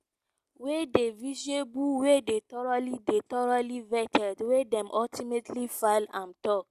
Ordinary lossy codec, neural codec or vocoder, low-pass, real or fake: Opus, 64 kbps; none; 14.4 kHz; real